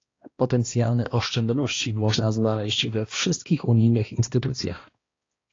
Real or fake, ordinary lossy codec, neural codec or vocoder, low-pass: fake; AAC, 32 kbps; codec, 16 kHz, 1 kbps, X-Codec, HuBERT features, trained on balanced general audio; 7.2 kHz